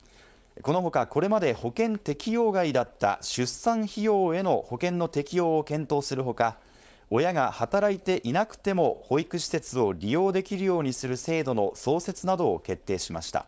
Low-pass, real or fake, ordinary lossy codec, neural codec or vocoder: none; fake; none; codec, 16 kHz, 4.8 kbps, FACodec